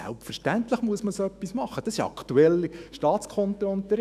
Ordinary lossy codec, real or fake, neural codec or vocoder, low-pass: none; fake; autoencoder, 48 kHz, 128 numbers a frame, DAC-VAE, trained on Japanese speech; 14.4 kHz